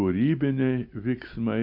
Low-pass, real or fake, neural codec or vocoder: 5.4 kHz; real; none